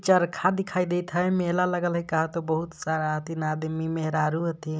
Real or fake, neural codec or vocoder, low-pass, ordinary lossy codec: real; none; none; none